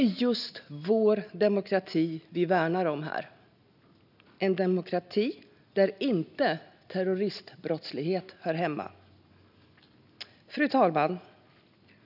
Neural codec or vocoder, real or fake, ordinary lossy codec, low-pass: none; real; none; 5.4 kHz